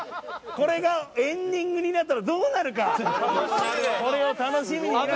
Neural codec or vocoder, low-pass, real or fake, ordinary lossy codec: none; none; real; none